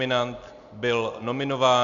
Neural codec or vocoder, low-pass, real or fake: none; 7.2 kHz; real